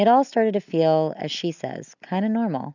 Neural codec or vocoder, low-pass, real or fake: none; 7.2 kHz; real